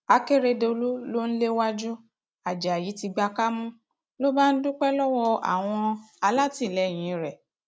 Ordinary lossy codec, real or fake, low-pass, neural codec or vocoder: none; real; none; none